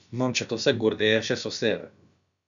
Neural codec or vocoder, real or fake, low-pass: codec, 16 kHz, about 1 kbps, DyCAST, with the encoder's durations; fake; 7.2 kHz